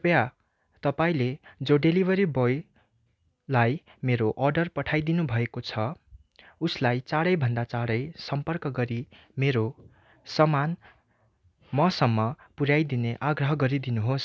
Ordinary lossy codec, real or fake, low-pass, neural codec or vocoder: none; real; none; none